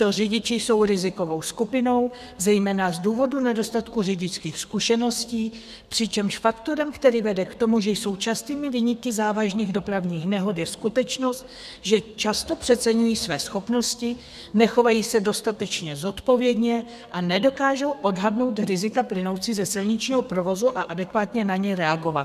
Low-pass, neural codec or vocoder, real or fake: 14.4 kHz; codec, 32 kHz, 1.9 kbps, SNAC; fake